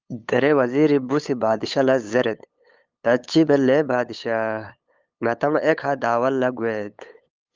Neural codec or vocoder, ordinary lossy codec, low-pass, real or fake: codec, 16 kHz, 8 kbps, FunCodec, trained on LibriTTS, 25 frames a second; Opus, 32 kbps; 7.2 kHz; fake